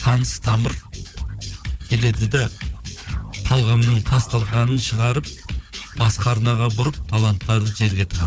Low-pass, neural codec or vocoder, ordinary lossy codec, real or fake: none; codec, 16 kHz, 4 kbps, FunCodec, trained on Chinese and English, 50 frames a second; none; fake